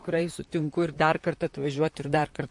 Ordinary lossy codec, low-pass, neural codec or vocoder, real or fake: MP3, 48 kbps; 10.8 kHz; vocoder, 44.1 kHz, 128 mel bands, Pupu-Vocoder; fake